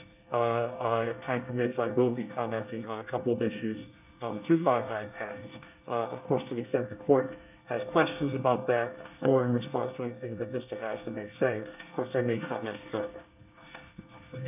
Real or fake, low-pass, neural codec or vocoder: fake; 3.6 kHz; codec, 24 kHz, 1 kbps, SNAC